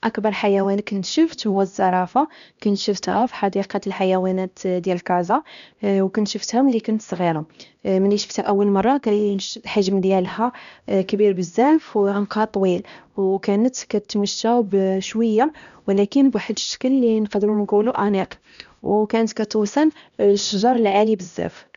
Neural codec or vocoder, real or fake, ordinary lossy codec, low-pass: codec, 16 kHz, 1 kbps, X-Codec, HuBERT features, trained on LibriSpeech; fake; none; 7.2 kHz